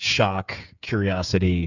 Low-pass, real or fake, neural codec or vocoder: 7.2 kHz; fake; codec, 16 kHz, 8 kbps, FreqCodec, smaller model